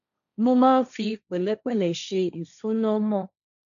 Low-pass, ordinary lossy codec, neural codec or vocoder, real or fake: 7.2 kHz; none; codec, 16 kHz, 1.1 kbps, Voila-Tokenizer; fake